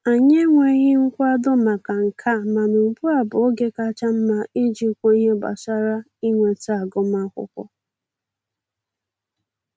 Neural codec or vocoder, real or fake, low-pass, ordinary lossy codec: none; real; none; none